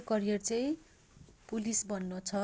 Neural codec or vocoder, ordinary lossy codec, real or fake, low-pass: none; none; real; none